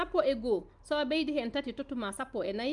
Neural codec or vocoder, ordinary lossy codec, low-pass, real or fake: none; Opus, 32 kbps; 10.8 kHz; real